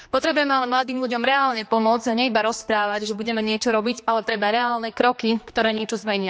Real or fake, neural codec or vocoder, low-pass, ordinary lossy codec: fake; codec, 16 kHz, 2 kbps, X-Codec, HuBERT features, trained on general audio; none; none